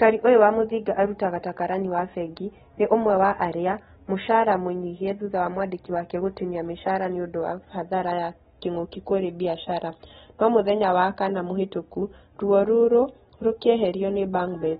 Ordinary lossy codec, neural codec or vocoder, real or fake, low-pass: AAC, 16 kbps; none; real; 10.8 kHz